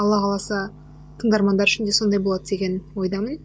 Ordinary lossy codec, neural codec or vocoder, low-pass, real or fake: none; none; none; real